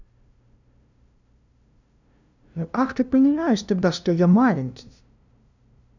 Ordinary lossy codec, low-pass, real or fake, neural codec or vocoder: none; 7.2 kHz; fake; codec, 16 kHz, 0.5 kbps, FunCodec, trained on LibriTTS, 25 frames a second